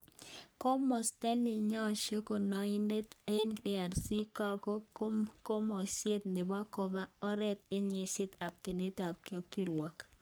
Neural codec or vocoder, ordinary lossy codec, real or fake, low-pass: codec, 44.1 kHz, 3.4 kbps, Pupu-Codec; none; fake; none